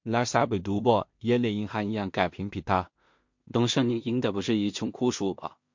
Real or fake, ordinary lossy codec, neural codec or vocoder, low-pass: fake; MP3, 48 kbps; codec, 16 kHz in and 24 kHz out, 0.4 kbps, LongCat-Audio-Codec, two codebook decoder; 7.2 kHz